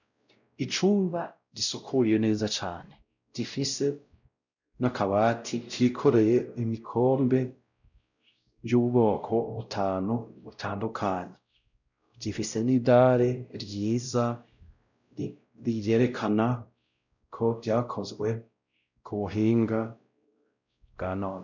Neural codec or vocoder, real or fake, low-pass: codec, 16 kHz, 0.5 kbps, X-Codec, WavLM features, trained on Multilingual LibriSpeech; fake; 7.2 kHz